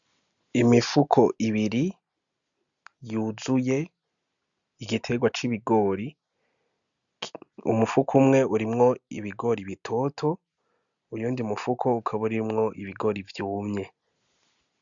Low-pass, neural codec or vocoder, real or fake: 7.2 kHz; none; real